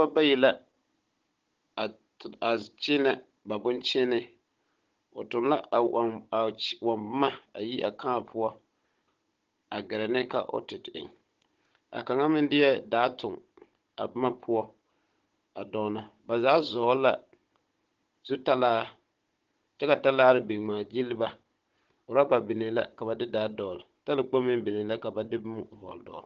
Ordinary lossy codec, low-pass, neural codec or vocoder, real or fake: Opus, 16 kbps; 7.2 kHz; codec, 16 kHz, 16 kbps, FunCodec, trained on Chinese and English, 50 frames a second; fake